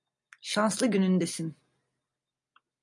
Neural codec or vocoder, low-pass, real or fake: none; 10.8 kHz; real